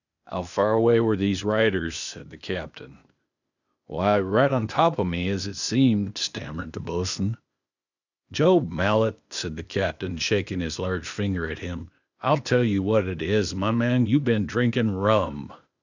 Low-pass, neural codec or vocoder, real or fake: 7.2 kHz; codec, 16 kHz, 0.8 kbps, ZipCodec; fake